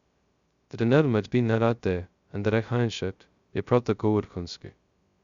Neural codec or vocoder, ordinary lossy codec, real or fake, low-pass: codec, 16 kHz, 0.2 kbps, FocalCodec; Opus, 64 kbps; fake; 7.2 kHz